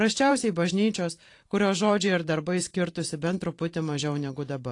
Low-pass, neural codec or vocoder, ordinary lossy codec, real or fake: 10.8 kHz; vocoder, 44.1 kHz, 128 mel bands every 512 samples, BigVGAN v2; AAC, 48 kbps; fake